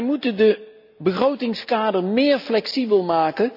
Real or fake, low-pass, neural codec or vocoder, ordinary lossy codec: real; 5.4 kHz; none; none